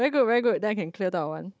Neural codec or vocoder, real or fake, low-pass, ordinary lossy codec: codec, 16 kHz, 16 kbps, FunCodec, trained on Chinese and English, 50 frames a second; fake; none; none